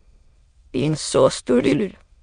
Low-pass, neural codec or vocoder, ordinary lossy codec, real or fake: 9.9 kHz; autoencoder, 22.05 kHz, a latent of 192 numbers a frame, VITS, trained on many speakers; MP3, 64 kbps; fake